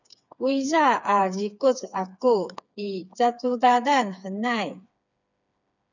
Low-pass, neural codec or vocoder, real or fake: 7.2 kHz; codec, 16 kHz, 4 kbps, FreqCodec, smaller model; fake